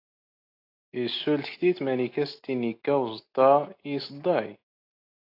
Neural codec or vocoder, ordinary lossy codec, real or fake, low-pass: none; AAC, 32 kbps; real; 5.4 kHz